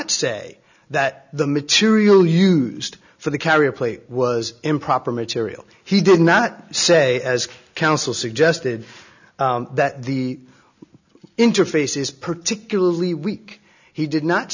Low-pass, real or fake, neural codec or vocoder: 7.2 kHz; real; none